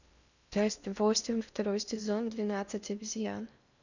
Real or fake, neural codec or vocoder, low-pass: fake; codec, 16 kHz in and 24 kHz out, 0.8 kbps, FocalCodec, streaming, 65536 codes; 7.2 kHz